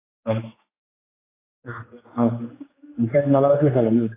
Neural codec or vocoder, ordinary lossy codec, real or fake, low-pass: codec, 16 kHz, 2 kbps, X-Codec, HuBERT features, trained on general audio; AAC, 16 kbps; fake; 3.6 kHz